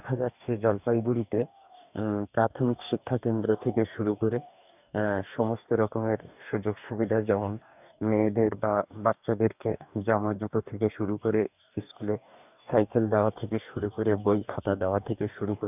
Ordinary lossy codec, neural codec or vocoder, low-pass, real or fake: none; codec, 44.1 kHz, 2.6 kbps, DAC; 3.6 kHz; fake